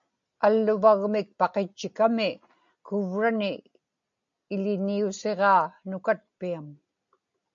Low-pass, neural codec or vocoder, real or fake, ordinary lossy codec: 7.2 kHz; none; real; MP3, 96 kbps